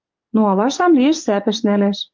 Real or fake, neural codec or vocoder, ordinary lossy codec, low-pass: fake; codec, 44.1 kHz, 7.8 kbps, DAC; Opus, 32 kbps; 7.2 kHz